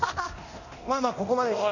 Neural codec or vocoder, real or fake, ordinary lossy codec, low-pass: codec, 24 kHz, 0.9 kbps, DualCodec; fake; none; 7.2 kHz